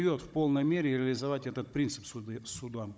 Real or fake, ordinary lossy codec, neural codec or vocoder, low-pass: fake; none; codec, 16 kHz, 16 kbps, FunCodec, trained on Chinese and English, 50 frames a second; none